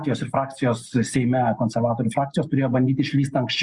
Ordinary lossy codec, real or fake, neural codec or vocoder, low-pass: Opus, 64 kbps; real; none; 10.8 kHz